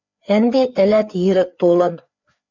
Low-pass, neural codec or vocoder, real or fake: 7.2 kHz; codec, 16 kHz, 4 kbps, FreqCodec, larger model; fake